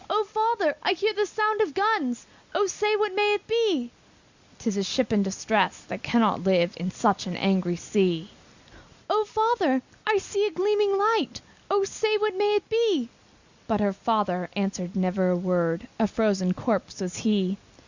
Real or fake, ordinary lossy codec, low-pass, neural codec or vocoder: real; Opus, 64 kbps; 7.2 kHz; none